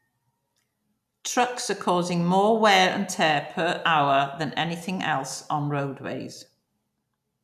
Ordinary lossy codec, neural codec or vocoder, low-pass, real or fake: none; none; 14.4 kHz; real